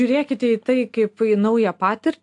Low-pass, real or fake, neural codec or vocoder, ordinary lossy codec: 10.8 kHz; real; none; AAC, 64 kbps